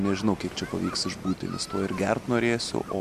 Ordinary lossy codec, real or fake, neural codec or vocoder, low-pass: MP3, 64 kbps; real; none; 14.4 kHz